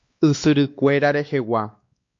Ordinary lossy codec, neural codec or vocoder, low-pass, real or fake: MP3, 48 kbps; codec, 16 kHz, 2 kbps, X-Codec, WavLM features, trained on Multilingual LibriSpeech; 7.2 kHz; fake